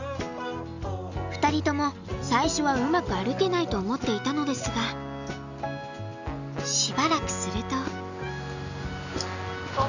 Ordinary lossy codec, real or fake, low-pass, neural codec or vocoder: none; real; 7.2 kHz; none